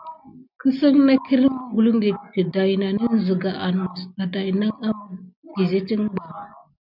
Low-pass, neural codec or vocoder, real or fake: 5.4 kHz; none; real